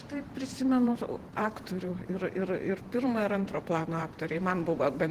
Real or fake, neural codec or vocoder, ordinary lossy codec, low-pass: fake; vocoder, 48 kHz, 128 mel bands, Vocos; Opus, 16 kbps; 14.4 kHz